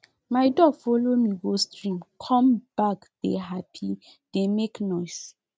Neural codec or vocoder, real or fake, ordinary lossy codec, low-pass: none; real; none; none